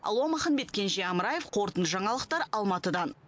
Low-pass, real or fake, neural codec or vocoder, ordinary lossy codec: none; real; none; none